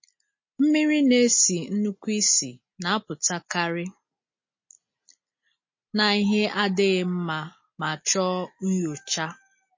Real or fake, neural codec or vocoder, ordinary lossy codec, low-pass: real; none; MP3, 32 kbps; 7.2 kHz